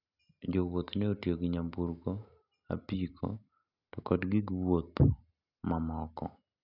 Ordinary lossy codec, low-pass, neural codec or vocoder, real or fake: none; 5.4 kHz; none; real